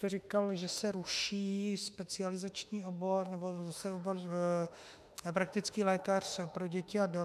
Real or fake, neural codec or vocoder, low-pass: fake; autoencoder, 48 kHz, 32 numbers a frame, DAC-VAE, trained on Japanese speech; 14.4 kHz